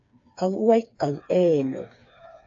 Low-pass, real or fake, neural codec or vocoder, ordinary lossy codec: 7.2 kHz; fake; codec, 16 kHz, 4 kbps, FreqCodec, smaller model; AAC, 48 kbps